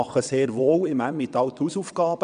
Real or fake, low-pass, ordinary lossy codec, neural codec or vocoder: fake; 9.9 kHz; none; vocoder, 22.05 kHz, 80 mel bands, WaveNeXt